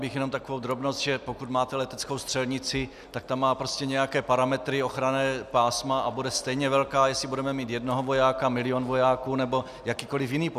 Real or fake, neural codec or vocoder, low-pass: real; none; 14.4 kHz